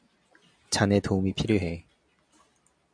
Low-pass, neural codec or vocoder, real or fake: 9.9 kHz; none; real